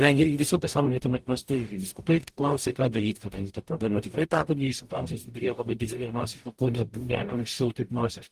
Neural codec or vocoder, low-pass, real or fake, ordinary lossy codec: codec, 44.1 kHz, 0.9 kbps, DAC; 14.4 kHz; fake; Opus, 24 kbps